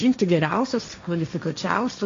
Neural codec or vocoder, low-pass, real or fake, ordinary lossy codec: codec, 16 kHz, 1.1 kbps, Voila-Tokenizer; 7.2 kHz; fake; MP3, 48 kbps